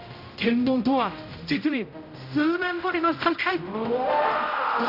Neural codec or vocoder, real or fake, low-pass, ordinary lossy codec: codec, 16 kHz, 0.5 kbps, X-Codec, HuBERT features, trained on general audio; fake; 5.4 kHz; none